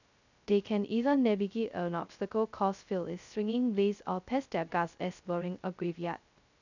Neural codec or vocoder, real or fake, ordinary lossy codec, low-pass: codec, 16 kHz, 0.2 kbps, FocalCodec; fake; none; 7.2 kHz